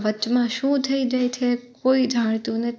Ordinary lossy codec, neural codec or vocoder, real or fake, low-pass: none; none; real; none